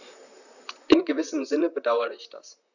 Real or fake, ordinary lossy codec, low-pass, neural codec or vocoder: fake; none; 7.2 kHz; vocoder, 44.1 kHz, 128 mel bands, Pupu-Vocoder